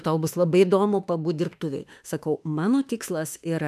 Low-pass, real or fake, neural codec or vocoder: 14.4 kHz; fake; autoencoder, 48 kHz, 32 numbers a frame, DAC-VAE, trained on Japanese speech